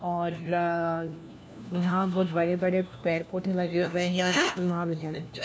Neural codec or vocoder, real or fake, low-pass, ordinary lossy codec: codec, 16 kHz, 1 kbps, FunCodec, trained on LibriTTS, 50 frames a second; fake; none; none